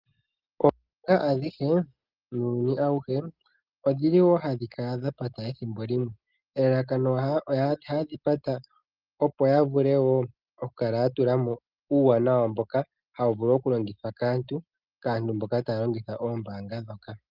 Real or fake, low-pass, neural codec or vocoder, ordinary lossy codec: real; 5.4 kHz; none; Opus, 24 kbps